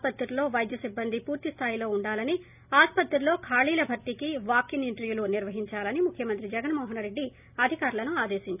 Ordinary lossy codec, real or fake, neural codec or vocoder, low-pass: none; real; none; 3.6 kHz